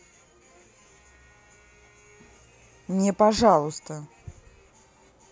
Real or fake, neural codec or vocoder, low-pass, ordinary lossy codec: real; none; none; none